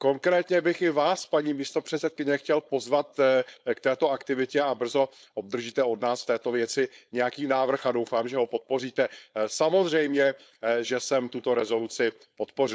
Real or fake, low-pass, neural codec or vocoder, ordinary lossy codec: fake; none; codec, 16 kHz, 4.8 kbps, FACodec; none